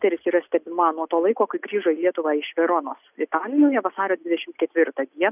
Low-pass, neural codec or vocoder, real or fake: 3.6 kHz; none; real